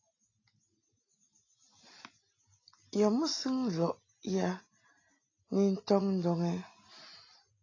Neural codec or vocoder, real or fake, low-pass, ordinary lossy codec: none; real; 7.2 kHz; AAC, 32 kbps